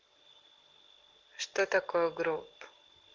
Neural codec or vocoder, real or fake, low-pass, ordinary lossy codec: none; real; 7.2 kHz; Opus, 16 kbps